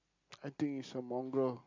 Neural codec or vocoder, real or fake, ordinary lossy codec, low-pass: none; real; AAC, 48 kbps; 7.2 kHz